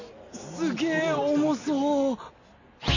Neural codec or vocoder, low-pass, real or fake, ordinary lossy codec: none; 7.2 kHz; real; none